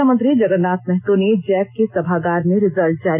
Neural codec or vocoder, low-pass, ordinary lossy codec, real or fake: none; 3.6 kHz; none; real